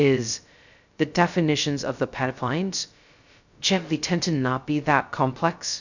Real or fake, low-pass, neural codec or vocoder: fake; 7.2 kHz; codec, 16 kHz, 0.2 kbps, FocalCodec